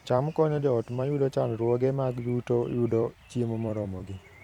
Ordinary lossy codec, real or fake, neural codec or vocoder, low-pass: none; fake; vocoder, 44.1 kHz, 128 mel bands every 256 samples, BigVGAN v2; 19.8 kHz